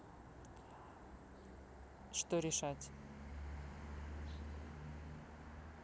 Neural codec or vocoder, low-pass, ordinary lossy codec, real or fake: none; none; none; real